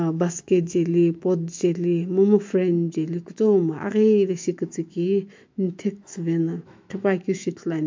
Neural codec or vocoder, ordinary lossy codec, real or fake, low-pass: autoencoder, 48 kHz, 128 numbers a frame, DAC-VAE, trained on Japanese speech; MP3, 48 kbps; fake; 7.2 kHz